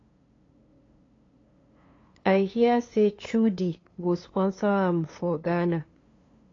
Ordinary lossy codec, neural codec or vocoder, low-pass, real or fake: AAC, 32 kbps; codec, 16 kHz, 2 kbps, FunCodec, trained on LibriTTS, 25 frames a second; 7.2 kHz; fake